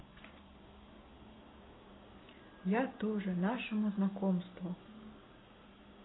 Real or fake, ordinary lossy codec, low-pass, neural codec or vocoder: real; AAC, 16 kbps; 7.2 kHz; none